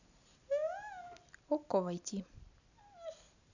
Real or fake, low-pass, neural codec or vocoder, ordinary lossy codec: real; 7.2 kHz; none; none